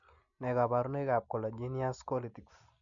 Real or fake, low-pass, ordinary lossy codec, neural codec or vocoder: real; 7.2 kHz; none; none